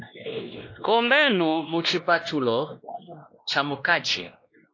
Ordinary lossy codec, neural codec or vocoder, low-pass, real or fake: AAC, 48 kbps; codec, 16 kHz, 1 kbps, X-Codec, WavLM features, trained on Multilingual LibriSpeech; 7.2 kHz; fake